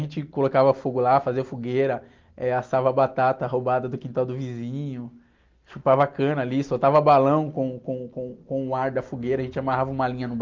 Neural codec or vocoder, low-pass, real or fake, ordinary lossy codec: none; 7.2 kHz; real; Opus, 32 kbps